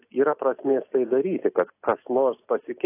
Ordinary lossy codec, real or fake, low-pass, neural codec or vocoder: AAC, 24 kbps; real; 3.6 kHz; none